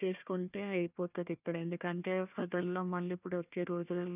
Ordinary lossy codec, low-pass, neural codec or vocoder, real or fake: none; 3.6 kHz; codec, 16 kHz, 1 kbps, FunCodec, trained on Chinese and English, 50 frames a second; fake